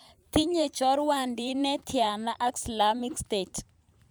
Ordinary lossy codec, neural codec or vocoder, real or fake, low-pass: none; vocoder, 44.1 kHz, 128 mel bands every 512 samples, BigVGAN v2; fake; none